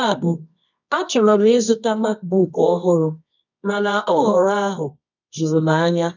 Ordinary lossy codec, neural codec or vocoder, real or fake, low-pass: none; codec, 24 kHz, 0.9 kbps, WavTokenizer, medium music audio release; fake; 7.2 kHz